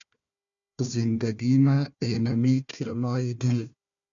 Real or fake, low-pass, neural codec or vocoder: fake; 7.2 kHz; codec, 16 kHz, 1 kbps, FunCodec, trained on Chinese and English, 50 frames a second